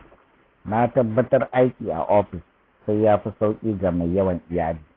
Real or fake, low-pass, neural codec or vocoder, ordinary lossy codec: real; 5.4 kHz; none; AAC, 24 kbps